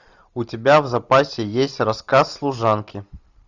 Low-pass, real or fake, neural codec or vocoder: 7.2 kHz; real; none